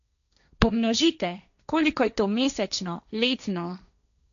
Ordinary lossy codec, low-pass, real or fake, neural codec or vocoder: none; 7.2 kHz; fake; codec, 16 kHz, 1.1 kbps, Voila-Tokenizer